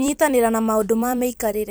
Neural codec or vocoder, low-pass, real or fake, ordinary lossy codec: vocoder, 44.1 kHz, 128 mel bands every 256 samples, BigVGAN v2; none; fake; none